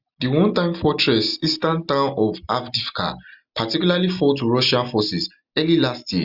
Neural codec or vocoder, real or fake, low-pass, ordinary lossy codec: none; real; 5.4 kHz; Opus, 64 kbps